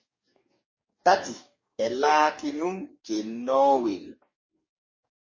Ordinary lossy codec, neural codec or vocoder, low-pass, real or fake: MP3, 32 kbps; codec, 44.1 kHz, 2.6 kbps, DAC; 7.2 kHz; fake